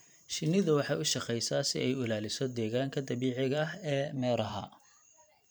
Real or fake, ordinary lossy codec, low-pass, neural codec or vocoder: fake; none; none; vocoder, 44.1 kHz, 128 mel bands every 512 samples, BigVGAN v2